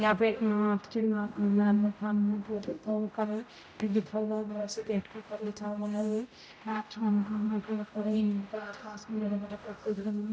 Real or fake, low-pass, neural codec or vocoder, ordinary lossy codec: fake; none; codec, 16 kHz, 0.5 kbps, X-Codec, HuBERT features, trained on general audio; none